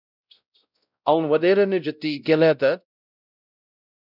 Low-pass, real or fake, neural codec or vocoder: 5.4 kHz; fake; codec, 16 kHz, 0.5 kbps, X-Codec, WavLM features, trained on Multilingual LibriSpeech